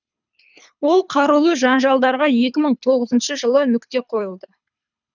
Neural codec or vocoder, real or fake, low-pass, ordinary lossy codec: codec, 24 kHz, 3 kbps, HILCodec; fake; 7.2 kHz; none